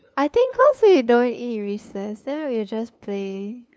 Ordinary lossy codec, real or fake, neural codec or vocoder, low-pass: none; fake; codec, 16 kHz, 2 kbps, FunCodec, trained on LibriTTS, 25 frames a second; none